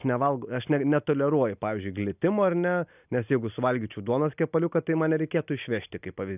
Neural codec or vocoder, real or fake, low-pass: none; real; 3.6 kHz